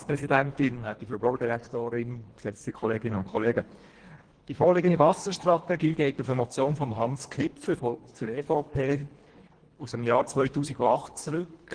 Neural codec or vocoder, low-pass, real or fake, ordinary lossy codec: codec, 24 kHz, 1.5 kbps, HILCodec; 9.9 kHz; fake; Opus, 16 kbps